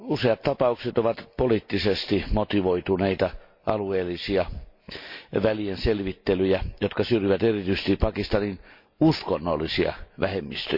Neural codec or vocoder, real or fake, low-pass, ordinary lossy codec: none; real; 5.4 kHz; MP3, 32 kbps